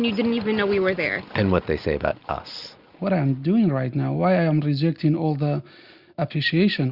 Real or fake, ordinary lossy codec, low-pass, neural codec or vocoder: real; Opus, 64 kbps; 5.4 kHz; none